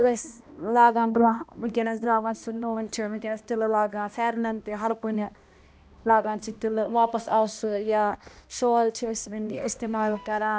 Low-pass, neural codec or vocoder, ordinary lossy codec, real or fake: none; codec, 16 kHz, 1 kbps, X-Codec, HuBERT features, trained on balanced general audio; none; fake